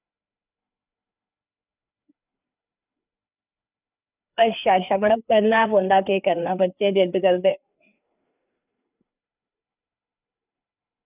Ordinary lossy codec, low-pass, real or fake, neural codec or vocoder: none; 3.6 kHz; fake; codec, 16 kHz, 4 kbps, FreqCodec, larger model